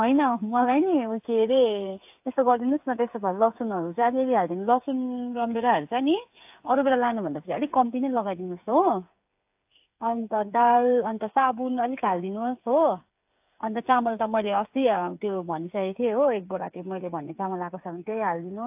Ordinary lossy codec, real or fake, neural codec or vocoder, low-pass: AAC, 32 kbps; fake; codec, 16 kHz, 8 kbps, FreqCodec, smaller model; 3.6 kHz